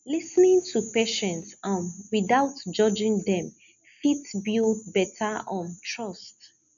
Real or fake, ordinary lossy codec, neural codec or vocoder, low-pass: real; none; none; 7.2 kHz